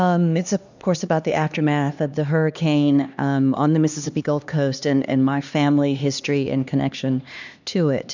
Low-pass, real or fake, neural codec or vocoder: 7.2 kHz; fake; codec, 16 kHz, 2 kbps, X-Codec, HuBERT features, trained on LibriSpeech